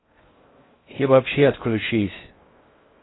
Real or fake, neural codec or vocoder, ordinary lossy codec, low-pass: fake; codec, 16 kHz in and 24 kHz out, 0.6 kbps, FocalCodec, streaming, 2048 codes; AAC, 16 kbps; 7.2 kHz